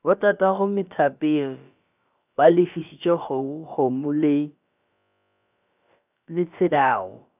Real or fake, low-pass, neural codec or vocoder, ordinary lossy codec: fake; 3.6 kHz; codec, 16 kHz, about 1 kbps, DyCAST, with the encoder's durations; none